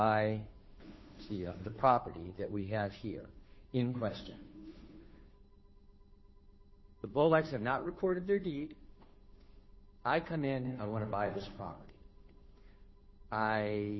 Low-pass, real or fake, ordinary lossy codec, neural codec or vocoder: 7.2 kHz; fake; MP3, 24 kbps; codec, 16 kHz, 2 kbps, FunCodec, trained on Chinese and English, 25 frames a second